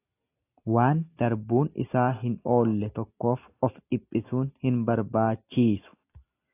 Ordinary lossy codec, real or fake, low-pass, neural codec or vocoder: AAC, 24 kbps; real; 3.6 kHz; none